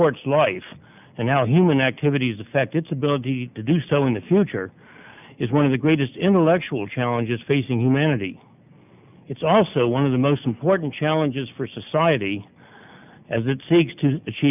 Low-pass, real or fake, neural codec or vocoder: 3.6 kHz; real; none